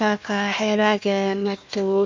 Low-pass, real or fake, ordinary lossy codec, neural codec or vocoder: 7.2 kHz; fake; MP3, 64 kbps; codec, 16 kHz, 1 kbps, FunCodec, trained on Chinese and English, 50 frames a second